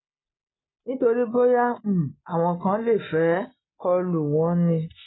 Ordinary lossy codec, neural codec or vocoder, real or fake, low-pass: AAC, 16 kbps; none; real; 7.2 kHz